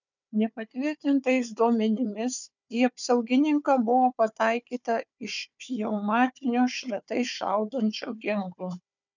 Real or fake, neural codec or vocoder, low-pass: fake; codec, 16 kHz, 4 kbps, FunCodec, trained on Chinese and English, 50 frames a second; 7.2 kHz